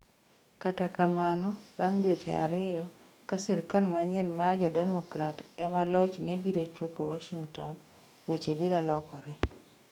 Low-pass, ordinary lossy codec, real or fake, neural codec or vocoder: 19.8 kHz; none; fake; codec, 44.1 kHz, 2.6 kbps, DAC